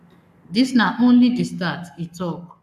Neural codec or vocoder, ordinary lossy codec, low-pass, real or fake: codec, 44.1 kHz, 7.8 kbps, Pupu-Codec; none; 14.4 kHz; fake